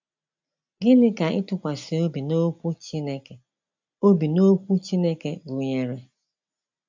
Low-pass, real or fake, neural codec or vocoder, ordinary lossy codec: 7.2 kHz; real; none; MP3, 64 kbps